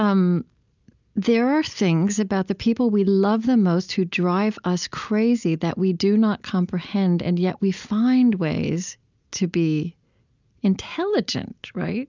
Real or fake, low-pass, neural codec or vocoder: real; 7.2 kHz; none